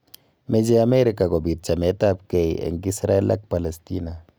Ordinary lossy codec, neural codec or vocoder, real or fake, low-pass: none; none; real; none